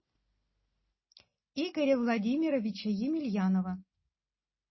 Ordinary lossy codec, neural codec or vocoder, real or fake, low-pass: MP3, 24 kbps; none; real; 7.2 kHz